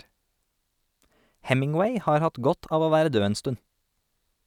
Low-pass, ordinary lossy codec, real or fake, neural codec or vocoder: 19.8 kHz; none; real; none